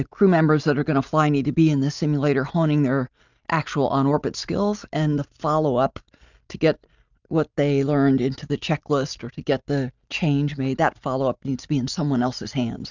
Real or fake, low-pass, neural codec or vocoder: real; 7.2 kHz; none